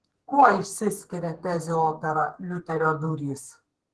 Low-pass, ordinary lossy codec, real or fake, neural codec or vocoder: 10.8 kHz; Opus, 16 kbps; fake; codec, 32 kHz, 1.9 kbps, SNAC